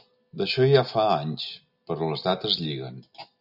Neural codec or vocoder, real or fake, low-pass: none; real; 5.4 kHz